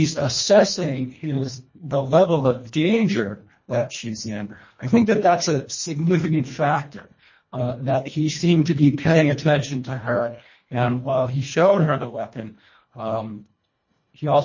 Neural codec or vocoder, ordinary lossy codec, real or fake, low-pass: codec, 24 kHz, 1.5 kbps, HILCodec; MP3, 32 kbps; fake; 7.2 kHz